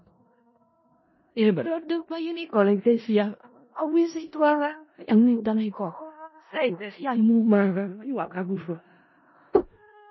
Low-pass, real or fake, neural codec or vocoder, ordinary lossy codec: 7.2 kHz; fake; codec, 16 kHz in and 24 kHz out, 0.4 kbps, LongCat-Audio-Codec, four codebook decoder; MP3, 24 kbps